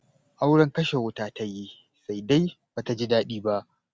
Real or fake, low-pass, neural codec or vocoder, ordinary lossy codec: real; none; none; none